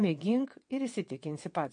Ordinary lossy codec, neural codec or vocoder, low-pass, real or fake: MP3, 48 kbps; vocoder, 22.05 kHz, 80 mel bands, WaveNeXt; 9.9 kHz; fake